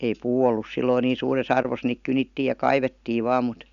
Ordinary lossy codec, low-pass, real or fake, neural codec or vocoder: none; 7.2 kHz; real; none